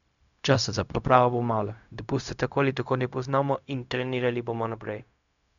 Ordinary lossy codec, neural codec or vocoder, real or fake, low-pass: none; codec, 16 kHz, 0.4 kbps, LongCat-Audio-Codec; fake; 7.2 kHz